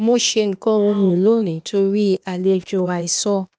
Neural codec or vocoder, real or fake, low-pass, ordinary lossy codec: codec, 16 kHz, 0.8 kbps, ZipCodec; fake; none; none